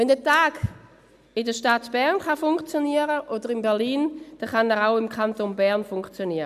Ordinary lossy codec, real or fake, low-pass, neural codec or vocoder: none; real; 14.4 kHz; none